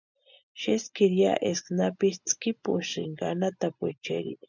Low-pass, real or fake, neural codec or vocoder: 7.2 kHz; real; none